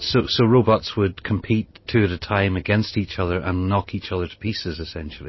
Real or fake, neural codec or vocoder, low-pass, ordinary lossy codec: real; none; 7.2 kHz; MP3, 24 kbps